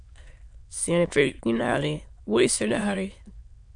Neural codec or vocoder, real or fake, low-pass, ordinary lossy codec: autoencoder, 22.05 kHz, a latent of 192 numbers a frame, VITS, trained on many speakers; fake; 9.9 kHz; MP3, 64 kbps